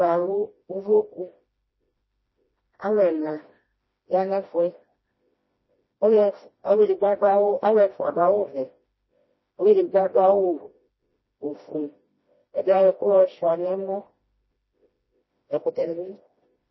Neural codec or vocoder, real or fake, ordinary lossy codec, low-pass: codec, 16 kHz, 1 kbps, FreqCodec, smaller model; fake; MP3, 24 kbps; 7.2 kHz